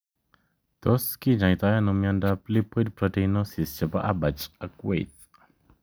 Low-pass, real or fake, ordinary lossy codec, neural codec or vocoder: none; real; none; none